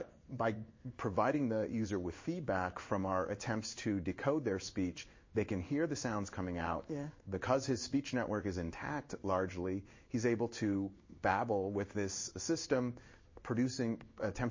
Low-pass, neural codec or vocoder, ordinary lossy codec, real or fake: 7.2 kHz; codec, 16 kHz in and 24 kHz out, 1 kbps, XY-Tokenizer; MP3, 32 kbps; fake